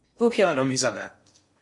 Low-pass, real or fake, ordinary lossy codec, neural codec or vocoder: 10.8 kHz; fake; MP3, 48 kbps; codec, 16 kHz in and 24 kHz out, 0.6 kbps, FocalCodec, streaming, 2048 codes